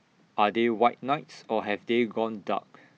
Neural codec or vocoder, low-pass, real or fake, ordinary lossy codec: none; none; real; none